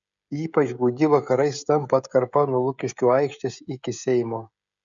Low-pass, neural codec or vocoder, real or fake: 7.2 kHz; codec, 16 kHz, 16 kbps, FreqCodec, smaller model; fake